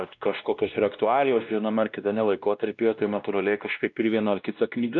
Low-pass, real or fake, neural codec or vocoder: 7.2 kHz; fake; codec, 16 kHz, 1 kbps, X-Codec, WavLM features, trained on Multilingual LibriSpeech